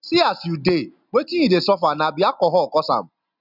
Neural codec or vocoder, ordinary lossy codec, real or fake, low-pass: none; none; real; 5.4 kHz